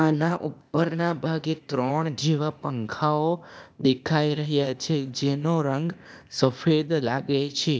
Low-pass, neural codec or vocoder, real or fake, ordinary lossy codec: none; codec, 16 kHz, 0.8 kbps, ZipCodec; fake; none